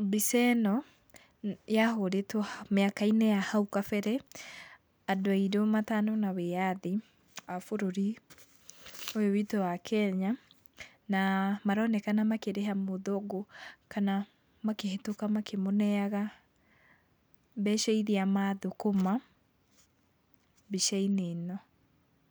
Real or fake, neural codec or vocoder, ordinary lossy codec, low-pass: real; none; none; none